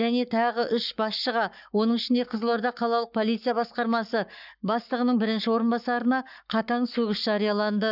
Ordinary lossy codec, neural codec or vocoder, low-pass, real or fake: none; codec, 44.1 kHz, 7.8 kbps, Pupu-Codec; 5.4 kHz; fake